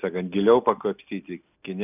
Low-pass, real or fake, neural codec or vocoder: 3.6 kHz; real; none